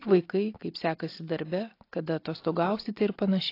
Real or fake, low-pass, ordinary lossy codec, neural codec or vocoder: real; 5.4 kHz; AAC, 32 kbps; none